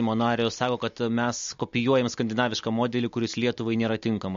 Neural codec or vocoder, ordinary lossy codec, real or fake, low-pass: none; MP3, 48 kbps; real; 7.2 kHz